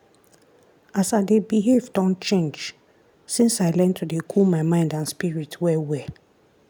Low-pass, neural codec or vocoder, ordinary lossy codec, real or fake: none; none; none; real